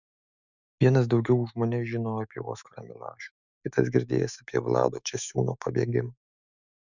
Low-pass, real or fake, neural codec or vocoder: 7.2 kHz; fake; autoencoder, 48 kHz, 128 numbers a frame, DAC-VAE, trained on Japanese speech